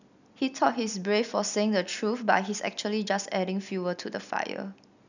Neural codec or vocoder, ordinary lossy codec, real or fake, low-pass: none; none; real; 7.2 kHz